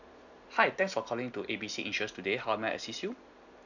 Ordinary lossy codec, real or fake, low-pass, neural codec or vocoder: none; real; 7.2 kHz; none